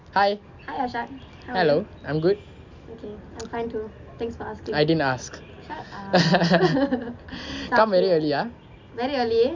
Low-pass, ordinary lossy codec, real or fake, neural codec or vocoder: 7.2 kHz; MP3, 64 kbps; real; none